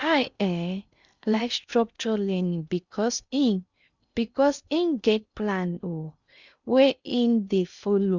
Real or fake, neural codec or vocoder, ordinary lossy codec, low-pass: fake; codec, 16 kHz in and 24 kHz out, 0.6 kbps, FocalCodec, streaming, 2048 codes; Opus, 64 kbps; 7.2 kHz